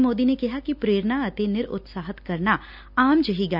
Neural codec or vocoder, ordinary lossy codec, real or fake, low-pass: none; none; real; 5.4 kHz